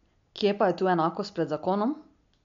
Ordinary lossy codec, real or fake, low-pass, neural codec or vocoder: MP3, 64 kbps; real; 7.2 kHz; none